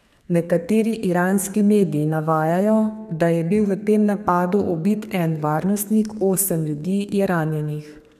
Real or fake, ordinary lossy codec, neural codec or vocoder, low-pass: fake; none; codec, 32 kHz, 1.9 kbps, SNAC; 14.4 kHz